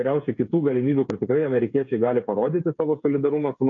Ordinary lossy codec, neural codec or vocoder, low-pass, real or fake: MP3, 96 kbps; codec, 16 kHz, 8 kbps, FreqCodec, smaller model; 7.2 kHz; fake